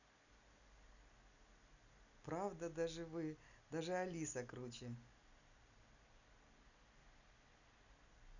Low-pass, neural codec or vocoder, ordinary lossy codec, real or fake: 7.2 kHz; none; none; real